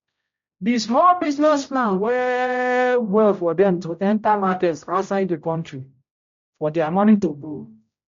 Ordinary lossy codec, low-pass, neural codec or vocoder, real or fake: MP3, 48 kbps; 7.2 kHz; codec, 16 kHz, 0.5 kbps, X-Codec, HuBERT features, trained on general audio; fake